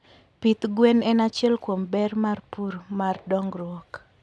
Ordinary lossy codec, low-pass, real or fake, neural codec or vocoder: none; none; real; none